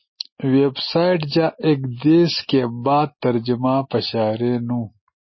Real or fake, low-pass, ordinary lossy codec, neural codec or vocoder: real; 7.2 kHz; MP3, 24 kbps; none